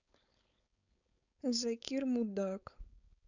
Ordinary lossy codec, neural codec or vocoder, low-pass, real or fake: none; codec, 16 kHz, 4.8 kbps, FACodec; 7.2 kHz; fake